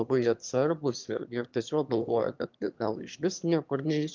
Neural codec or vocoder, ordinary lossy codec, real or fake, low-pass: autoencoder, 22.05 kHz, a latent of 192 numbers a frame, VITS, trained on one speaker; Opus, 24 kbps; fake; 7.2 kHz